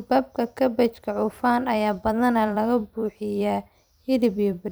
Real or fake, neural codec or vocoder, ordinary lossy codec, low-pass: fake; vocoder, 44.1 kHz, 128 mel bands every 256 samples, BigVGAN v2; none; none